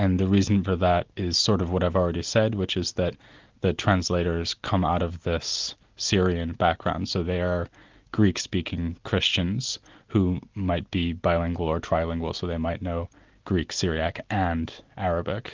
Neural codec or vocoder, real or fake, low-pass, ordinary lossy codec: none; real; 7.2 kHz; Opus, 24 kbps